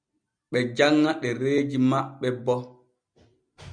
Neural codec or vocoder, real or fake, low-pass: none; real; 9.9 kHz